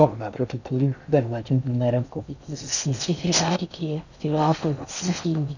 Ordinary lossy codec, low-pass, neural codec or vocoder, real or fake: none; 7.2 kHz; codec, 16 kHz in and 24 kHz out, 0.6 kbps, FocalCodec, streaming, 2048 codes; fake